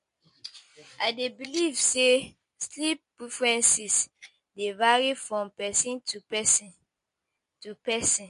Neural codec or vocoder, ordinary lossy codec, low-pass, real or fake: none; MP3, 48 kbps; 14.4 kHz; real